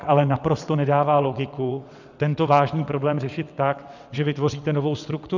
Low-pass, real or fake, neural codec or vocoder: 7.2 kHz; fake; vocoder, 22.05 kHz, 80 mel bands, WaveNeXt